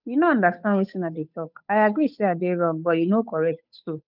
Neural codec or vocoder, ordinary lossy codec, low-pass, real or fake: codec, 16 kHz, 8 kbps, FunCodec, trained on Chinese and English, 25 frames a second; none; 5.4 kHz; fake